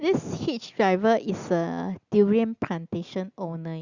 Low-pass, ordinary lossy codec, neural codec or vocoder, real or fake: 7.2 kHz; Opus, 64 kbps; none; real